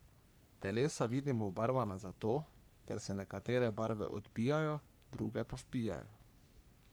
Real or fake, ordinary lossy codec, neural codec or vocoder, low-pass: fake; none; codec, 44.1 kHz, 3.4 kbps, Pupu-Codec; none